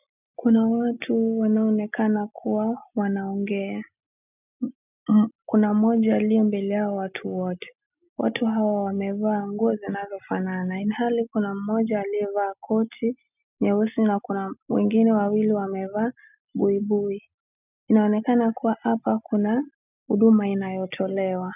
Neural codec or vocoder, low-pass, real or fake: none; 3.6 kHz; real